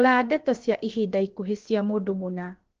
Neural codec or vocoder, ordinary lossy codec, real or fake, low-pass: codec, 16 kHz, about 1 kbps, DyCAST, with the encoder's durations; Opus, 16 kbps; fake; 7.2 kHz